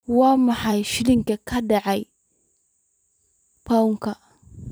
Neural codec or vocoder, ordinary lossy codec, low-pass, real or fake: vocoder, 44.1 kHz, 128 mel bands every 512 samples, BigVGAN v2; none; none; fake